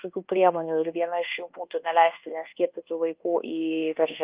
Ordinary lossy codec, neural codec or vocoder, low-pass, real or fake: Opus, 24 kbps; codec, 24 kHz, 1.2 kbps, DualCodec; 3.6 kHz; fake